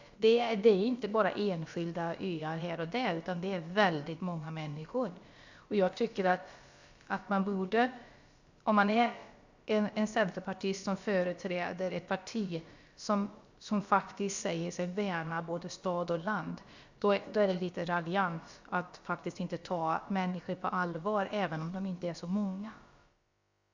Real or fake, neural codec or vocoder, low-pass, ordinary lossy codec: fake; codec, 16 kHz, about 1 kbps, DyCAST, with the encoder's durations; 7.2 kHz; Opus, 64 kbps